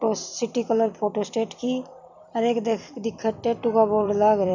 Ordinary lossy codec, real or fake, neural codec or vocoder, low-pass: none; fake; vocoder, 44.1 kHz, 128 mel bands every 256 samples, BigVGAN v2; 7.2 kHz